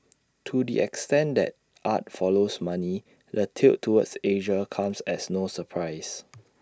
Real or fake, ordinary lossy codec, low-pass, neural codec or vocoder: real; none; none; none